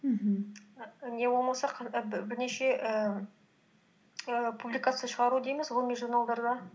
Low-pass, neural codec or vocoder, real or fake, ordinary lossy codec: none; none; real; none